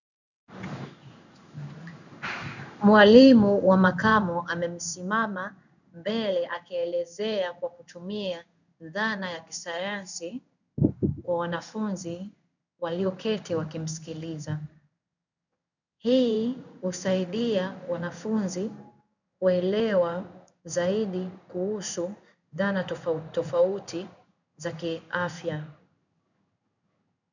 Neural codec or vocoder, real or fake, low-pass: codec, 16 kHz in and 24 kHz out, 1 kbps, XY-Tokenizer; fake; 7.2 kHz